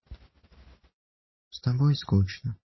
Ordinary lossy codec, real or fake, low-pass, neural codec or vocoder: MP3, 24 kbps; real; 7.2 kHz; none